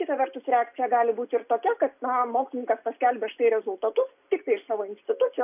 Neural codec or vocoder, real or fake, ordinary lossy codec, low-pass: none; real; AAC, 32 kbps; 3.6 kHz